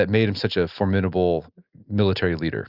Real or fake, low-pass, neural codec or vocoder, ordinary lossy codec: real; 5.4 kHz; none; Opus, 64 kbps